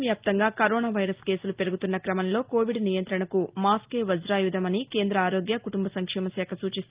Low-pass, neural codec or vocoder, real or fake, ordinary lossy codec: 3.6 kHz; none; real; Opus, 32 kbps